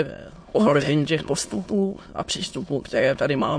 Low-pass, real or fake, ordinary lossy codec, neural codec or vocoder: 9.9 kHz; fake; MP3, 48 kbps; autoencoder, 22.05 kHz, a latent of 192 numbers a frame, VITS, trained on many speakers